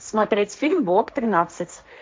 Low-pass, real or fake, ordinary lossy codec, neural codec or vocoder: none; fake; none; codec, 16 kHz, 1.1 kbps, Voila-Tokenizer